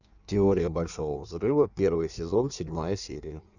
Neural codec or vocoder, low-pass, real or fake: codec, 16 kHz in and 24 kHz out, 1.1 kbps, FireRedTTS-2 codec; 7.2 kHz; fake